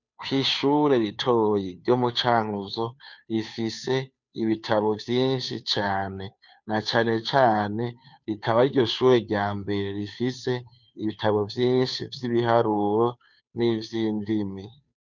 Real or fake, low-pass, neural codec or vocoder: fake; 7.2 kHz; codec, 16 kHz, 2 kbps, FunCodec, trained on Chinese and English, 25 frames a second